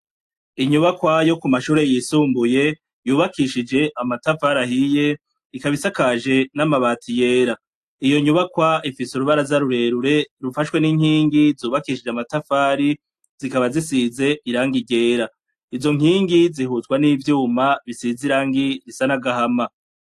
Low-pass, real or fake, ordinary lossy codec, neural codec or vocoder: 14.4 kHz; real; AAC, 64 kbps; none